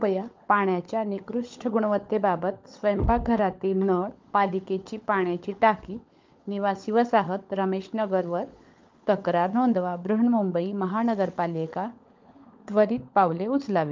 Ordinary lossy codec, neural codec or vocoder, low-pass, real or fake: Opus, 24 kbps; codec, 16 kHz, 16 kbps, FunCodec, trained on LibriTTS, 50 frames a second; 7.2 kHz; fake